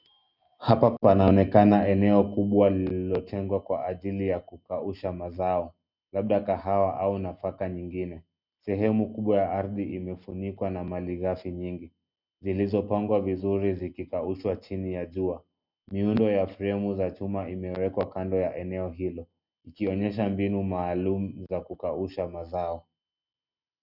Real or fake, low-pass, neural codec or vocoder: real; 5.4 kHz; none